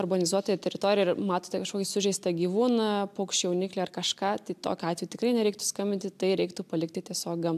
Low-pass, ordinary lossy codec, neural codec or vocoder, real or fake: 14.4 kHz; AAC, 96 kbps; none; real